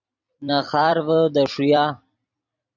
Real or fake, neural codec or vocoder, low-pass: fake; vocoder, 44.1 kHz, 128 mel bands every 256 samples, BigVGAN v2; 7.2 kHz